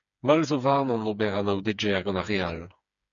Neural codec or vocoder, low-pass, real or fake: codec, 16 kHz, 4 kbps, FreqCodec, smaller model; 7.2 kHz; fake